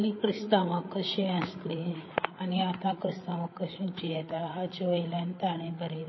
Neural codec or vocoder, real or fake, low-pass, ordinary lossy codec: codec, 16 kHz, 8 kbps, FreqCodec, larger model; fake; 7.2 kHz; MP3, 24 kbps